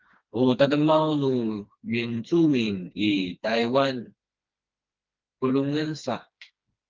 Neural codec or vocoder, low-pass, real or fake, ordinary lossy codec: codec, 16 kHz, 2 kbps, FreqCodec, smaller model; 7.2 kHz; fake; Opus, 24 kbps